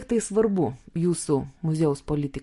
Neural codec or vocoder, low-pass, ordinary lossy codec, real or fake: none; 14.4 kHz; MP3, 48 kbps; real